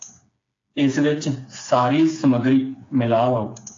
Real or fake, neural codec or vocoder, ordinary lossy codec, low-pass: fake; codec, 16 kHz, 4 kbps, FreqCodec, smaller model; AAC, 48 kbps; 7.2 kHz